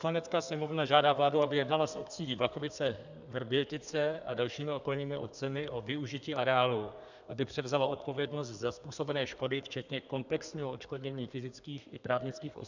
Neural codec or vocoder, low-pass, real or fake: codec, 32 kHz, 1.9 kbps, SNAC; 7.2 kHz; fake